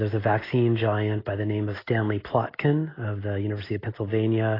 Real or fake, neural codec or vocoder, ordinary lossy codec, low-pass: real; none; AAC, 24 kbps; 5.4 kHz